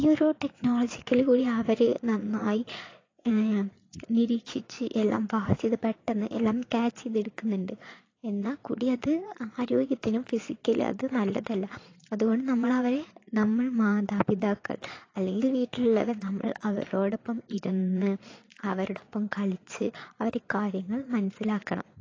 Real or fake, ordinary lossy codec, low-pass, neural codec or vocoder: fake; AAC, 32 kbps; 7.2 kHz; vocoder, 22.05 kHz, 80 mel bands, Vocos